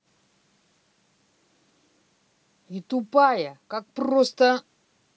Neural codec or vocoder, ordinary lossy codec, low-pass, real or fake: none; none; none; real